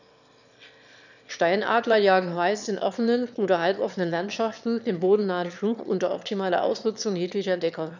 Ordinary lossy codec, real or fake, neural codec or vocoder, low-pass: none; fake; autoencoder, 22.05 kHz, a latent of 192 numbers a frame, VITS, trained on one speaker; 7.2 kHz